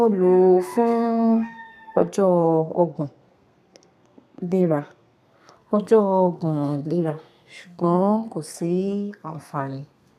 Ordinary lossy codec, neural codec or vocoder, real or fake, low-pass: none; codec, 32 kHz, 1.9 kbps, SNAC; fake; 14.4 kHz